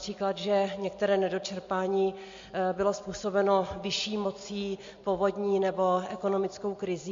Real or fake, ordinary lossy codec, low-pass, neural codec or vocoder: real; MP3, 48 kbps; 7.2 kHz; none